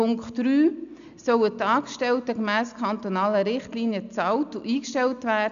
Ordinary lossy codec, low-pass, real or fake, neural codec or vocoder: none; 7.2 kHz; real; none